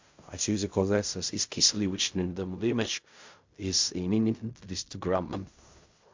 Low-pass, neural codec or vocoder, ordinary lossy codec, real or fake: 7.2 kHz; codec, 16 kHz in and 24 kHz out, 0.4 kbps, LongCat-Audio-Codec, fine tuned four codebook decoder; MP3, 64 kbps; fake